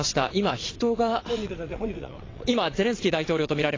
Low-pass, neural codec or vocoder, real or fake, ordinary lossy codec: 7.2 kHz; vocoder, 22.05 kHz, 80 mel bands, WaveNeXt; fake; AAC, 32 kbps